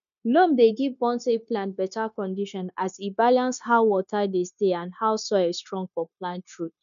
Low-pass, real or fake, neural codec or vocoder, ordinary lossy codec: 7.2 kHz; fake; codec, 16 kHz, 0.9 kbps, LongCat-Audio-Codec; none